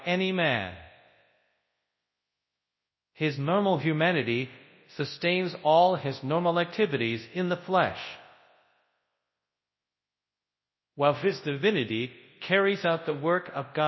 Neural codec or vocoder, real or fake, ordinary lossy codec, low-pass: codec, 24 kHz, 0.9 kbps, WavTokenizer, large speech release; fake; MP3, 24 kbps; 7.2 kHz